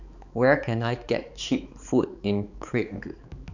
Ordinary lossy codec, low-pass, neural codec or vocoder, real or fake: none; 7.2 kHz; codec, 16 kHz, 4 kbps, X-Codec, HuBERT features, trained on balanced general audio; fake